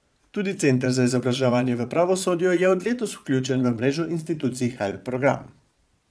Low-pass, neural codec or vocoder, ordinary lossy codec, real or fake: none; vocoder, 22.05 kHz, 80 mel bands, Vocos; none; fake